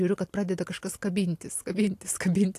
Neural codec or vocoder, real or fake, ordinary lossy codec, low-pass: none; real; AAC, 64 kbps; 14.4 kHz